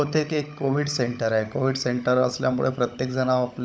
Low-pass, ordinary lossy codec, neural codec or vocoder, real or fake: none; none; codec, 16 kHz, 16 kbps, FunCodec, trained on LibriTTS, 50 frames a second; fake